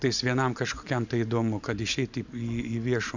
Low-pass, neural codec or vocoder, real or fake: 7.2 kHz; none; real